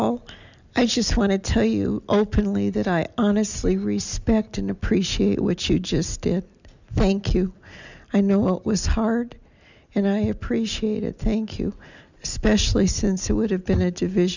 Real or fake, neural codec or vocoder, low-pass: real; none; 7.2 kHz